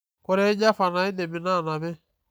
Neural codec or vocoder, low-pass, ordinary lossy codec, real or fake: none; none; none; real